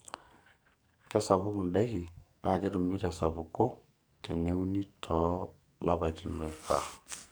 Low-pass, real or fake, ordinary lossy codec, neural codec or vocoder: none; fake; none; codec, 44.1 kHz, 2.6 kbps, SNAC